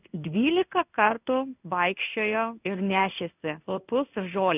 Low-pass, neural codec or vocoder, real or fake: 3.6 kHz; codec, 16 kHz in and 24 kHz out, 1 kbps, XY-Tokenizer; fake